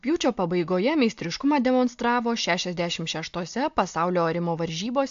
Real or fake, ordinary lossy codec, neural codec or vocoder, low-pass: real; AAC, 64 kbps; none; 7.2 kHz